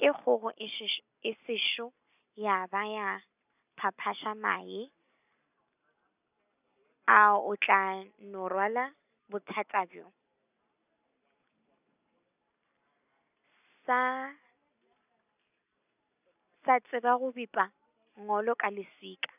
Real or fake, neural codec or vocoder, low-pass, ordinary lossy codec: real; none; 3.6 kHz; none